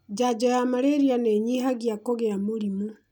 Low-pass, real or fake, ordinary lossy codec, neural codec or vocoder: 19.8 kHz; real; none; none